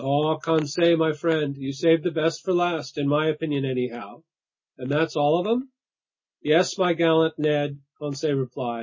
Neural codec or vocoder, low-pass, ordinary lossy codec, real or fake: none; 7.2 kHz; MP3, 32 kbps; real